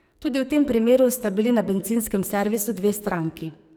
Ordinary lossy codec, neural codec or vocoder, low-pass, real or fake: none; codec, 44.1 kHz, 2.6 kbps, SNAC; none; fake